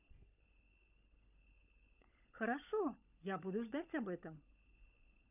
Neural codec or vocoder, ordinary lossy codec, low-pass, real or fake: codec, 16 kHz, 16 kbps, FunCodec, trained on LibriTTS, 50 frames a second; none; 3.6 kHz; fake